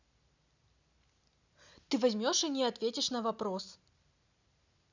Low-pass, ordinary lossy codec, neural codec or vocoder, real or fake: 7.2 kHz; none; none; real